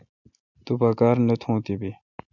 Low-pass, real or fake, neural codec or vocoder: 7.2 kHz; real; none